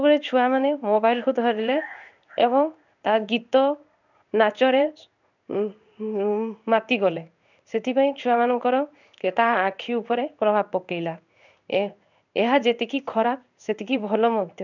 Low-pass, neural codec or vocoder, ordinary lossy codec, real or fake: 7.2 kHz; codec, 16 kHz in and 24 kHz out, 1 kbps, XY-Tokenizer; none; fake